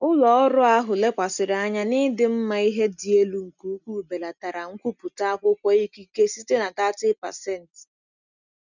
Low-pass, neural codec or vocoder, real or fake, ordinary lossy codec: 7.2 kHz; none; real; none